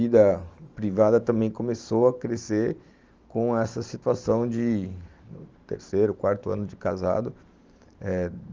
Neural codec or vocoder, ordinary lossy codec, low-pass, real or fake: vocoder, 44.1 kHz, 128 mel bands every 512 samples, BigVGAN v2; Opus, 32 kbps; 7.2 kHz; fake